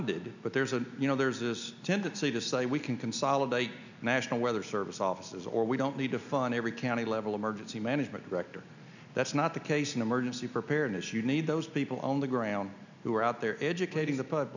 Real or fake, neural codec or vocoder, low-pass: real; none; 7.2 kHz